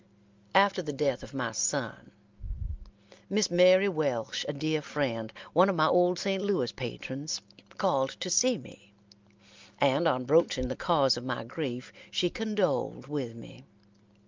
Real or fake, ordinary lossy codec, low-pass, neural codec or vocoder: real; Opus, 32 kbps; 7.2 kHz; none